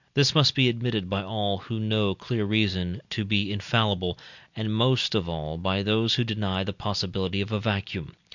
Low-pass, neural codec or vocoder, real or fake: 7.2 kHz; none; real